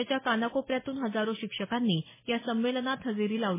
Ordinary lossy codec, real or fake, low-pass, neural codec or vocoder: MP3, 16 kbps; real; 3.6 kHz; none